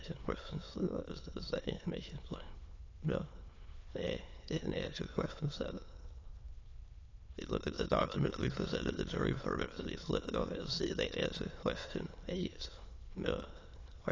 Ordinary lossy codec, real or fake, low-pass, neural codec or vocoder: AAC, 32 kbps; fake; 7.2 kHz; autoencoder, 22.05 kHz, a latent of 192 numbers a frame, VITS, trained on many speakers